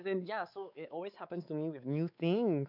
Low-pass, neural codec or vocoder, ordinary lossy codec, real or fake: 5.4 kHz; codec, 16 kHz, 4 kbps, X-Codec, WavLM features, trained on Multilingual LibriSpeech; none; fake